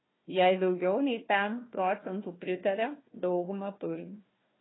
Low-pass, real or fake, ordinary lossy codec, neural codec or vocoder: 7.2 kHz; fake; AAC, 16 kbps; codec, 16 kHz, 1 kbps, FunCodec, trained on Chinese and English, 50 frames a second